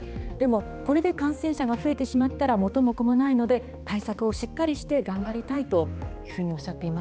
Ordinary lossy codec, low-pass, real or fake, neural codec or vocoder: none; none; fake; codec, 16 kHz, 2 kbps, X-Codec, HuBERT features, trained on balanced general audio